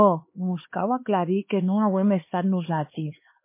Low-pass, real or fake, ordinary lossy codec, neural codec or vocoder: 3.6 kHz; fake; MP3, 24 kbps; codec, 16 kHz, 2 kbps, X-Codec, HuBERT features, trained on LibriSpeech